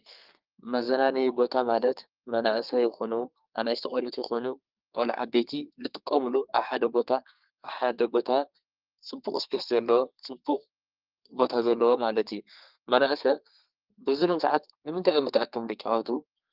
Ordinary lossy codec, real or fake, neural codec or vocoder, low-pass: Opus, 24 kbps; fake; codec, 32 kHz, 1.9 kbps, SNAC; 5.4 kHz